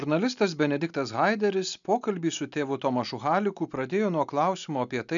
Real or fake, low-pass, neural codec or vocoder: real; 7.2 kHz; none